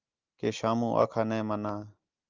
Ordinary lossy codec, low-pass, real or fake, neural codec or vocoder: Opus, 24 kbps; 7.2 kHz; real; none